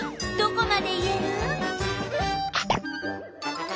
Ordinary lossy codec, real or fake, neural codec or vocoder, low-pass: none; real; none; none